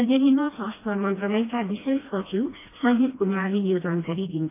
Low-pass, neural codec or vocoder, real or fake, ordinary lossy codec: 3.6 kHz; codec, 16 kHz, 1 kbps, FreqCodec, smaller model; fake; AAC, 24 kbps